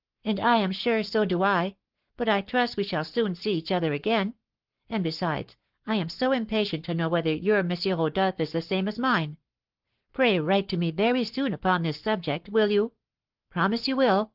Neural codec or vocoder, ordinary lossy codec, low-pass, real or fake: codec, 44.1 kHz, 7.8 kbps, Pupu-Codec; Opus, 16 kbps; 5.4 kHz; fake